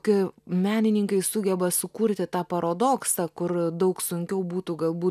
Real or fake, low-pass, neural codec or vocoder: real; 14.4 kHz; none